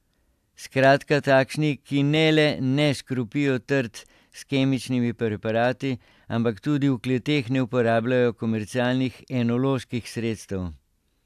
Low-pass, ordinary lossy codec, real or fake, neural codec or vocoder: 14.4 kHz; AAC, 96 kbps; real; none